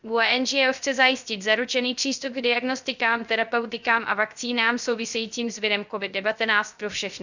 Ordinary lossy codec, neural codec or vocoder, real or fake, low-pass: none; codec, 16 kHz, 0.3 kbps, FocalCodec; fake; 7.2 kHz